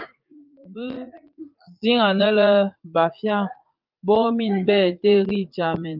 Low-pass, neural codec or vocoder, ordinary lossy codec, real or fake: 5.4 kHz; vocoder, 44.1 kHz, 80 mel bands, Vocos; Opus, 24 kbps; fake